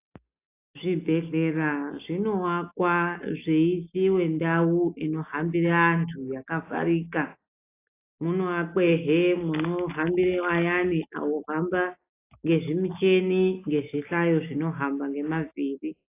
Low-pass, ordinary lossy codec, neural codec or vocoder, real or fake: 3.6 kHz; AAC, 24 kbps; none; real